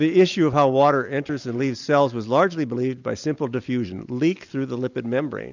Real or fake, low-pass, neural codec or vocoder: real; 7.2 kHz; none